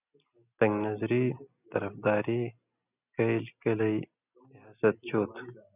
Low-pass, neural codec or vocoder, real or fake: 3.6 kHz; none; real